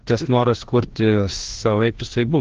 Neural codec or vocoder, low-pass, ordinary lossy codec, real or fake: codec, 16 kHz, 1 kbps, FreqCodec, larger model; 7.2 kHz; Opus, 16 kbps; fake